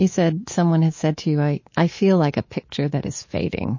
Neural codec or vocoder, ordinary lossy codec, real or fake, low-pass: codec, 24 kHz, 3.1 kbps, DualCodec; MP3, 32 kbps; fake; 7.2 kHz